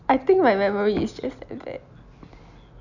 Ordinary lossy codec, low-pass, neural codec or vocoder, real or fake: none; 7.2 kHz; none; real